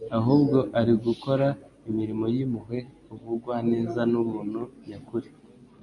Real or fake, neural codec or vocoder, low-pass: real; none; 10.8 kHz